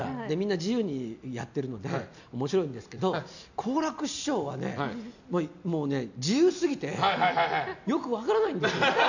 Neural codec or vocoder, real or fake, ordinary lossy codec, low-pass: none; real; none; 7.2 kHz